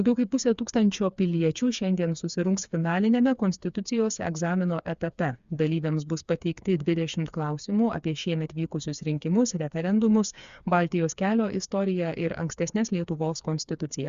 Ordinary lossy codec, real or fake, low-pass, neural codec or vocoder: Opus, 64 kbps; fake; 7.2 kHz; codec, 16 kHz, 4 kbps, FreqCodec, smaller model